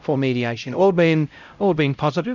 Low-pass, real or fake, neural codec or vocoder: 7.2 kHz; fake; codec, 16 kHz, 0.5 kbps, X-Codec, HuBERT features, trained on LibriSpeech